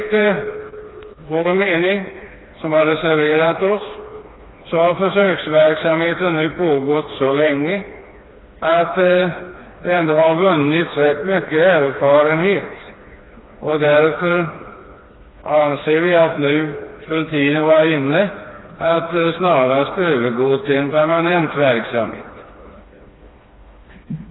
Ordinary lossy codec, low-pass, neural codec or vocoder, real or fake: AAC, 16 kbps; 7.2 kHz; codec, 16 kHz, 2 kbps, FreqCodec, smaller model; fake